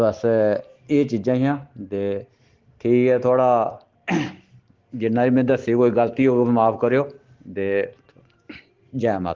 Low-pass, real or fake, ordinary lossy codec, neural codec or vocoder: 7.2 kHz; real; Opus, 16 kbps; none